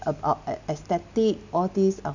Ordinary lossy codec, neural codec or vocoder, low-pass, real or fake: none; none; 7.2 kHz; real